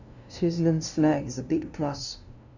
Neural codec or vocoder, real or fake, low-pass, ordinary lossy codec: codec, 16 kHz, 0.5 kbps, FunCodec, trained on LibriTTS, 25 frames a second; fake; 7.2 kHz; none